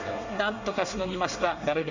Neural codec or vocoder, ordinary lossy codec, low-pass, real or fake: codec, 24 kHz, 1 kbps, SNAC; Opus, 64 kbps; 7.2 kHz; fake